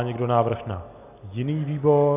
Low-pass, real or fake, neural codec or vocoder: 3.6 kHz; real; none